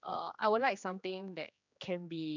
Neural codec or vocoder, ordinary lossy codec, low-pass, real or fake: codec, 16 kHz, 2 kbps, X-Codec, HuBERT features, trained on general audio; none; 7.2 kHz; fake